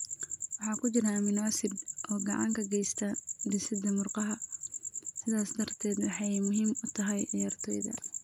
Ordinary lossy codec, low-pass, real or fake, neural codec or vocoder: none; 19.8 kHz; real; none